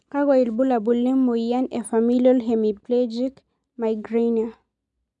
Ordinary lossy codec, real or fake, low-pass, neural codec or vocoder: none; real; 10.8 kHz; none